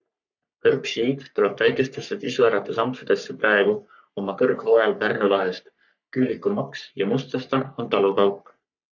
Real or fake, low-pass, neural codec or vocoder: fake; 7.2 kHz; codec, 44.1 kHz, 3.4 kbps, Pupu-Codec